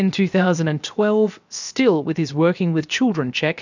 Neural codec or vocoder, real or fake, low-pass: codec, 16 kHz, 0.7 kbps, FocalCodec; fake; 7.2 kHz